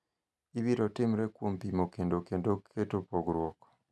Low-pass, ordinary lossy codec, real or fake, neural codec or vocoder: none; none; real; none